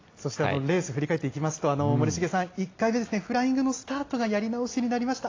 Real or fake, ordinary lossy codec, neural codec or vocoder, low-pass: real; AAC, 32 kbps; none; 7.2 kHz